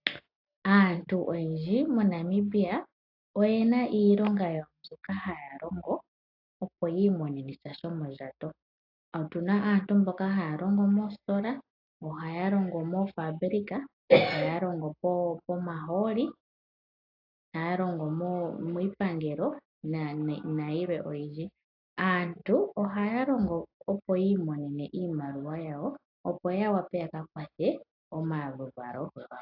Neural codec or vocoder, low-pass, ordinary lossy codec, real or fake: none; 5.4 kHz; AAC, 48 kbps; real